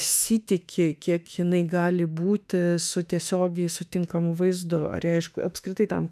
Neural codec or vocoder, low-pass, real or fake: autoencoder, 48 kHz, 32 numbers a frame, DAC-VAE, trained on Japanese speech; 14.4 kHz; fake